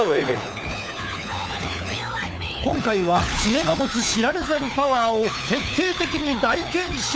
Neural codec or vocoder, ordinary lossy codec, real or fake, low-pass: codec, 16 kHz, 4 kbps, FunCodec, trained on LibriTTS, 50 frames a second; none; fake; none